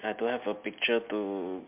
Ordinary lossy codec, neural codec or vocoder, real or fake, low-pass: MP3, 32 kbps; none; real; 3.6 kHz